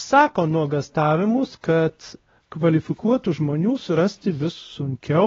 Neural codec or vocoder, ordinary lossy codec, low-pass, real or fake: codec, 16 kHz, 1 kbps, X-Codec, WavLM features, trained on Multilingual LibriSpeech; AAC, 24 kbps; 7.2 kHz; fake